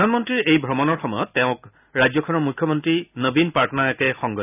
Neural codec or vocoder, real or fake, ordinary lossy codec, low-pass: none; real; none; 3.6 kHz